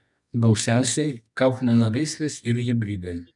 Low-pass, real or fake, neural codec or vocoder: 10.8 kHz; fake; codec, 24 kHz, 0.9 kbps, WavTokenizer, medium music audio release